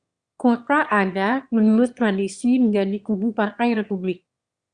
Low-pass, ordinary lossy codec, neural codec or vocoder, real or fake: 9.9 kHz; Opus, 64 kbps; autoencoder, 22.05 kHz, a latent of 192 numbers a frame, VITS, trained on one speaker; fake